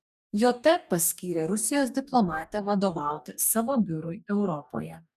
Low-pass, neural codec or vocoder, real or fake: 14.4 kHz; codec, 44.1 kHz, 2.6 kbps, DAC; fake